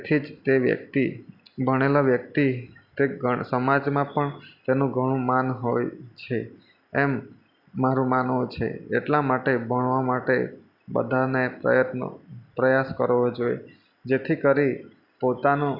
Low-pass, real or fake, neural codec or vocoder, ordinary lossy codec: 5.4 kHz; real; none; none